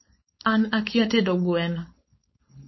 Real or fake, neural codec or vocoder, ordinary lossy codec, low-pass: fake; codec, 16 kHz, 4.8 kbps, FACodec; MP3, 24 kbps; 7.2 kHz